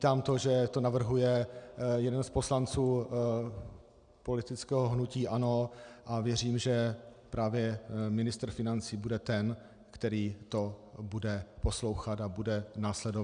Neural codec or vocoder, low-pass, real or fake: none; 9.9 kHz; real